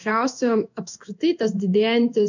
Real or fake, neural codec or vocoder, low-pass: fake; codec, 16 kHz in and 24 kHz out, 1 kbps, XY-Tokenizer; 7.2 kHz